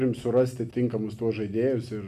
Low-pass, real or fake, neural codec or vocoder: 14.4 kHz; real; none